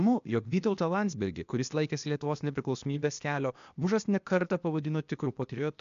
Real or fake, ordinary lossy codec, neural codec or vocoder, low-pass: fake; MP3, 64 kbps; codec, 16 kHz, 0.8 kbps, ZipCodec; 7.2 kHz